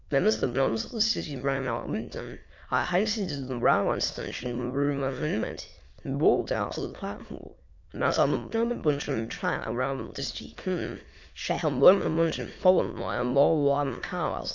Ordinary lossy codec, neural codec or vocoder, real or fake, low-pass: MP3, 48 kbps; autoencoder, 22.05 kHz, a latent of 192 numbers a frame, VITS, trained on many speakers; fake; 7.2 kHz